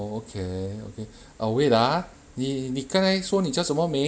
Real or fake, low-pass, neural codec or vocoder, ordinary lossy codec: real; none; none; none